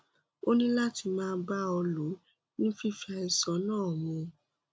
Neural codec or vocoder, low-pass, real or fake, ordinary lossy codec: none; none; real; none